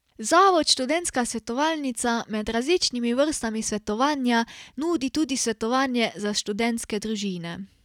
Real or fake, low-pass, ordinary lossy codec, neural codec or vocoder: real; 19.8 kHz; none; none